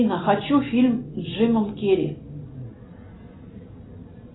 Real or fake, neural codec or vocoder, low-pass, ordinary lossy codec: real; none; 7.2 kHz; AAC, 16 kbps